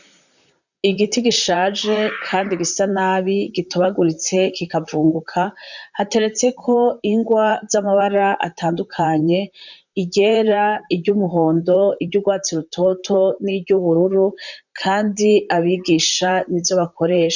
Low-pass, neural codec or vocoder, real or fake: 7.2 kHz; vocoder, 44.1 kHz, 128 mel bands, Pupu-Vocoder; fake